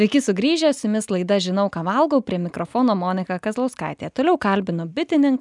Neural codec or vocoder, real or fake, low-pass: none; real; 10.8 kHz